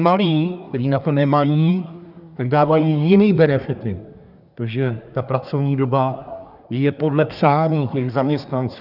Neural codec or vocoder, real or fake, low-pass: codec, 24 kHz, 1 kbps, SNAC; fake; 5.4 kHz